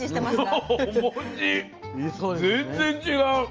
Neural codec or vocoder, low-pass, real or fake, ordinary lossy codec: none; 7.2 kHz; real; Opus, 24 kbps